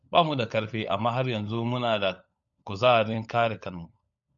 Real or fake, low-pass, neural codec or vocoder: fake; 7.2 kHz; codec, 16 kHz, 16 kbps, FunCodec, trained on LibriTTS, 50 frames a second